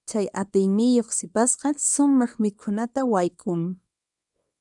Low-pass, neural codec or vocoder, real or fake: 10.8 kHz; codec, 24 kHz, 0.9 kbps, WavTokenizer, small release; fake